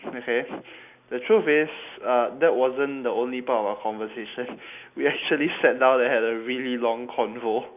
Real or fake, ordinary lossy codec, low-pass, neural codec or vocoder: real; none; 3.6 kHz; none